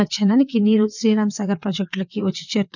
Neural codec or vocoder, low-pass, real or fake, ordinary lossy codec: vocoder, 22.05 kHz, 80 mel bands, WaveNeXt; 7.2 kHz; fake; none